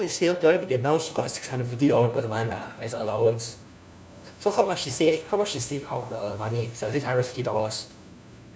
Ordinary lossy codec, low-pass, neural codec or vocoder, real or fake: none; none; codec, 16 kHz, 1 kbps, FunCodec, trained on LibriTTS, 50 frames a second; fake